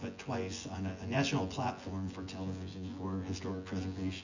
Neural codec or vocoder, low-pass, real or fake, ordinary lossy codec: vocoder, 24 kHz, 100 mel bands, Vocos; 7.2 kHz; fake; Opus, 64 kbps